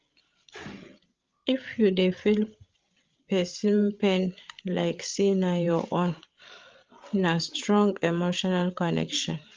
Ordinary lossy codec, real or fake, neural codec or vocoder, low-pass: Opus, 24 kbps; fake; codec, 16 kHz, 8 kbps, FreqCodec, larger model; 7.2 kHz